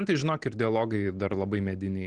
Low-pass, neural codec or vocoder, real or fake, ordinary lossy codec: 9.9 kHz; none; real; Opus, 16 kbps